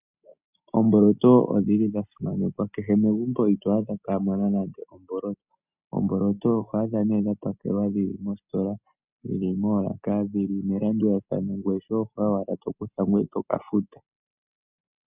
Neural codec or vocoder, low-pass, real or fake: none; 3.6 kHz; real